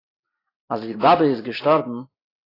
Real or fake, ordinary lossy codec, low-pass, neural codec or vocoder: fake; AAC, 24 kbps; 5.4 kHz; vocoder, 44.1 kHz, 128 mel bands every 256 samples, BigVGAN v2